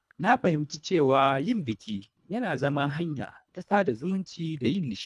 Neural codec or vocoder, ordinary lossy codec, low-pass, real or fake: codec, 24 kHz, 1.5 kbps, HILCodec; none; none; fake